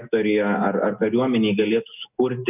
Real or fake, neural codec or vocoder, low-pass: real; none; 3.6 kHz